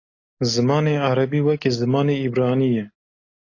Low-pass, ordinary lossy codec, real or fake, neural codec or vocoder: 7.2 kHz; AAC, 32 kbps; real; none